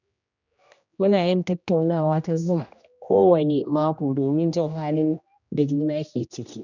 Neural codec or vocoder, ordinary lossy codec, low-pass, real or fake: codec, 16 kHz, 1 kbps, X-Codec, HuBERT features, trained on general audio; none; 7.2 kHz; fake